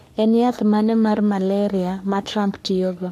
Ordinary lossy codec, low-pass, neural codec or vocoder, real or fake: AAC, 64 kbps; 14.4 kHz; codec, 44.1 kHz, 3.4 kbps, Pupu-Codec; fake